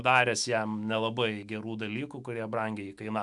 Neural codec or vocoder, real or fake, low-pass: autoencoder, 48 kHz, 128 numbers a frame, DAC-VAE, trained on Japanese speech; fake; 10.8 kHz